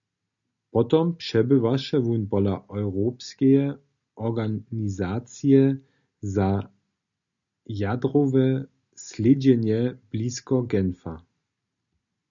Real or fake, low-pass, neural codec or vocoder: real; 7.2 kHz; none